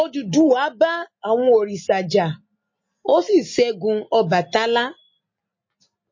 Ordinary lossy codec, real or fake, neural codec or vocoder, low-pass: MP3, 32 kbps; real; none; 7.2 kHz